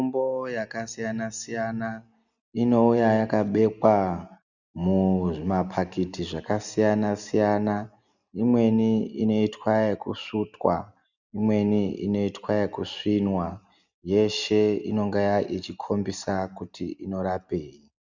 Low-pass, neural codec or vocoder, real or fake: 7.2 kHz; none; real